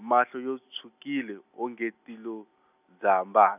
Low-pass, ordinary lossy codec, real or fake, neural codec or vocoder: 3.6 kHz; none; real; none